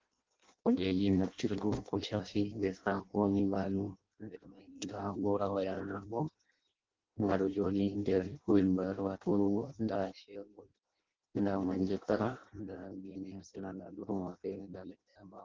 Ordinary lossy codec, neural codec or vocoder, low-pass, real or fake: Opus, 16 kbps; codec, 16 kHz in and 24 kHz out, 0.6 kbps, FireRedTTS-2 codec; 7.2 kHz; fake